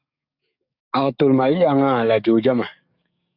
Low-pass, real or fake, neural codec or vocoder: 5.4 kHz; fake; codec, 44.1 kHz, 7.8 kbps, DAC